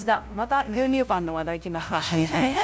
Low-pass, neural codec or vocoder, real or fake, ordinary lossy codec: none; codec, 16 kHz, 0.5 kbps, FunCodec, trained on LibriTTS, 25 frames a second; fake; none